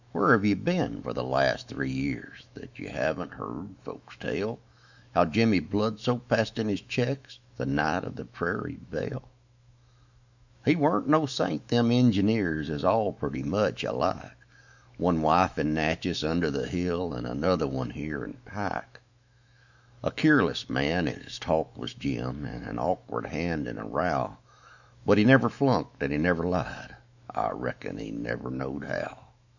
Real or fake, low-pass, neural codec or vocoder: fake; 7.2 kHz; autoencoder, 48 kHz, 128 numbers a frame, DAC-VAE, trained on Japanese speech